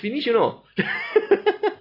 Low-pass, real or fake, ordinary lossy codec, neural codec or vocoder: 5.4 kHz; real; AAC, 24 kbps; none